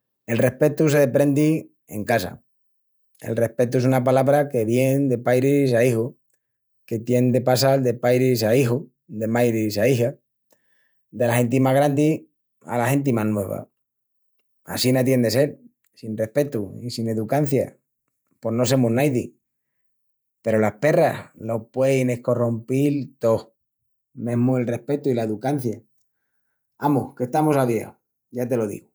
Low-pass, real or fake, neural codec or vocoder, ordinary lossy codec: none; fake; vocoder, 48 kHz, 128 mel bands, Vocos; none